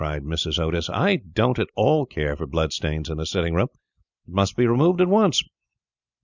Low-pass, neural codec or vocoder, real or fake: 7.2 kHz; none; real